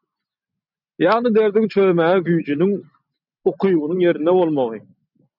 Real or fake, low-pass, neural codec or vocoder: real; 5.4 kHz; none